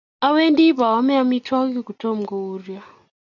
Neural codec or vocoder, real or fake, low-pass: none; real; 7.2 kHz